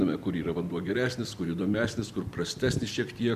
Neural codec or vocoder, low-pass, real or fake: vocoder, 44.1 kHz, 128 mel bands every 256 samples, BigVGAN v2; 14.4 kHz; fake